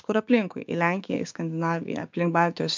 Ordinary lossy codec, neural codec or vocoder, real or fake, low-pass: MP3, 64 kbps; codec, 16 kHz, 6 kbps, DAC; fake; 7.2 kHz